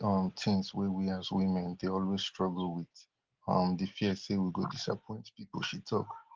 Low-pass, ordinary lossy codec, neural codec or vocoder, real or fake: 7.2 kHz; Opus, 16 kbps; none; real